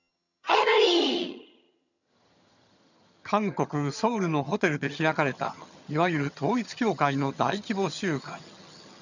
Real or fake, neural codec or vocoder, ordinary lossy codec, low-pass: fake; vocoder, 22.05 kHz, 80 mel bands, HiFi-GAN; none; 7.2 kHz